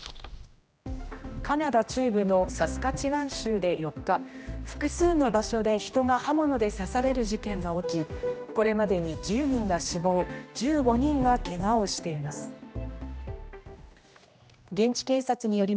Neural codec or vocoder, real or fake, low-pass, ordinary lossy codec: codec, 16 kHz, 1 kbps, X-Codec, HuBERT features, trained on general audio; fake; none; none